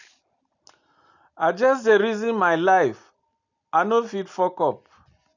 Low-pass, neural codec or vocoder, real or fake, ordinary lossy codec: 7.2 kHz; none; real; none